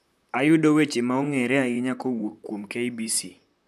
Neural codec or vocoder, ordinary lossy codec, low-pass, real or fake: vocoder, 44.1 kHz, 128 mel bands, Pupu-Vocoder; none; 14.4 kHz; fake